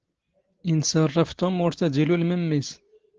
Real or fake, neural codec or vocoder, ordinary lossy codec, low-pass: real; none; Opus, 16 kbps; 7.2 kHz